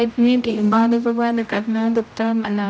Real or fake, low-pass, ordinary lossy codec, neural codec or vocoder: fake; none; none; codec, 16 kHz, 0.5 kbps, X-Codec, HuBERT features, trained on general audio